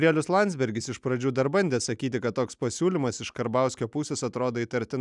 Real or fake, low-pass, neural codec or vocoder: real; 10.8 kHz; none